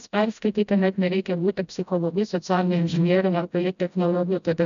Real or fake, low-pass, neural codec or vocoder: fake; 7.2 kHz; codec, 16 kHz, 0.5 kbps, FreqCodec, smaller model